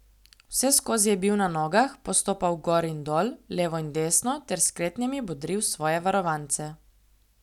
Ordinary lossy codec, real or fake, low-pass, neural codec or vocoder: none; real; 19.8 kHz; none